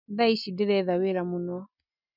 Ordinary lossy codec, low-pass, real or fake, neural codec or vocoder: none; 5.4 kHz; real; none